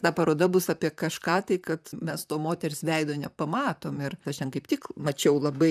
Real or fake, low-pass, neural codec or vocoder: fake; 14.4 kHz; vocoder, 44.1 kHz, 128 mel bands, Pupu-Vocoder